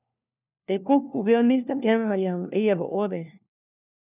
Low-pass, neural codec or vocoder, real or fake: 3.6 kHz; codec, 16 kHz, 1 kbps, FunCodec, trained on LibriTTS, 50 frames a second; fake